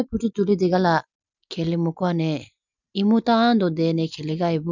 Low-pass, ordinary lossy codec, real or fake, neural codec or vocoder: 7.2 kHz; none; real; none